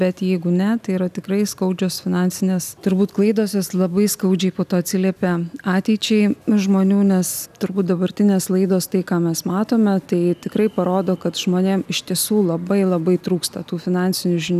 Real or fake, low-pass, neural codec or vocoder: real; 14.4 kHz; none